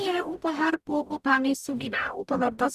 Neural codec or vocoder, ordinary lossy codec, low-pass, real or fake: codec, 44.1 kHz, 0.9 kbps, DAC; none; 14.4 kHz; fake